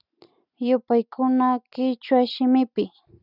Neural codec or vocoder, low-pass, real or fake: none; 5.4 kHz; real